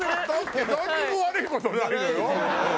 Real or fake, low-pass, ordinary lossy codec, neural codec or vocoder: real; none; none; none